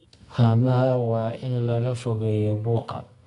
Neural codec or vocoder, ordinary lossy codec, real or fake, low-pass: codec, 24 kHz, 0.9 kbps, WavTokenizer, medium music audio release; MP3, 64 kbps; fake; 10.8 kHz